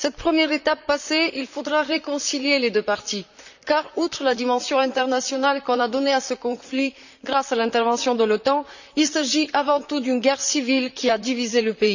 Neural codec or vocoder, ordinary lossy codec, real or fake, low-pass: vocoder, 44.1 kHz, 128 mel bands, Pupu-Vocoder; none; fake; 7.2 kHz